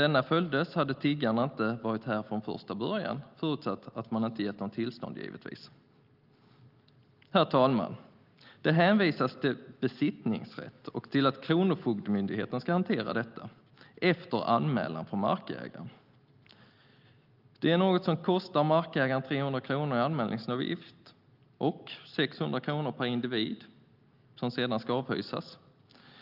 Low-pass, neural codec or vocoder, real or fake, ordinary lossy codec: 5.4 kHz; none; real; Opus, 24 kbps